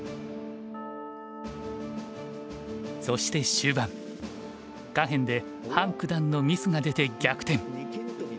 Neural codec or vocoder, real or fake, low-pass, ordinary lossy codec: none; real; none; none